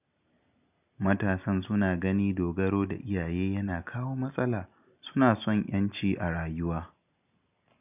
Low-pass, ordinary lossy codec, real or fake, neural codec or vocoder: 3.6 kHz; none; real; none